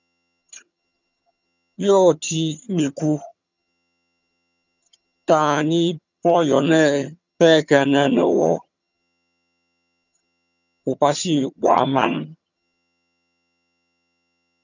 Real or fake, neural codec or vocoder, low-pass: fake; vocoder, 22.05 kHz, 80 mel bands, HiFi-GAN; 7.2 kHz